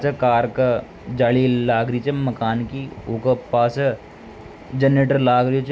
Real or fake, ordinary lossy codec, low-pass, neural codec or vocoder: real; none; none; none